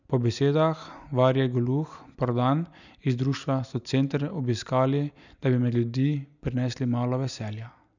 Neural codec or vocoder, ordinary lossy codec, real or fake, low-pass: none; none; real; 7.2 kHz